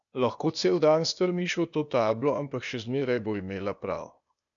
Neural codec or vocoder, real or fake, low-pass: codec, 16 kHz, 0.8 kbps, ZipCodec; fake; 7.2 kHz